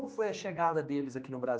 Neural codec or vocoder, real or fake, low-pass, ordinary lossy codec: codec, 16 kHz, 2 kbps, X-Codec, HuBERT features, trained on general audio; fake; none; none